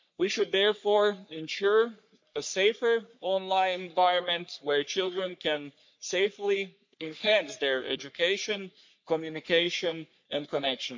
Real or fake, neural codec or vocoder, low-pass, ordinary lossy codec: fake; codec, 44.1 kHz, 3.4 kbps, Pupu-Codec; 7.2 kHz; MP3, 48 kbps